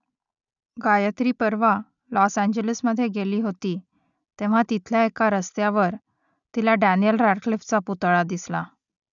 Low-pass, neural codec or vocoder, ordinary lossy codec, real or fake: 7.2 kHz; none; none; real